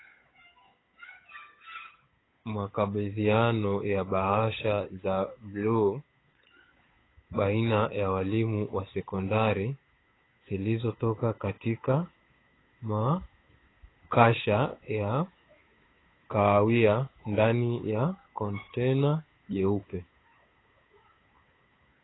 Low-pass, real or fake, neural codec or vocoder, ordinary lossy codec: 7.2 kHz; fake; codec, 16 kHz, 8 kbps, FunCodec, trained on Chinese and English, 25 frames a second; AAC, 16 kbps